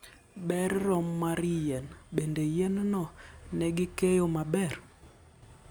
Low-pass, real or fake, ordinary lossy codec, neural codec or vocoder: none; real; none; none